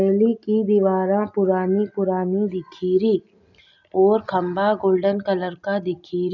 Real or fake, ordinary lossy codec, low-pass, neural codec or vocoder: real; none; 7.2 kHz; none